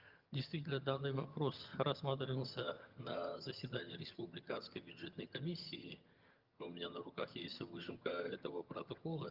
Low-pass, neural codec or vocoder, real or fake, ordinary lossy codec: 5.4 kHz; vocoder, 22.05 kHz, 80 mel bands, HiFi-GAN; fake; Opus, 24 kbps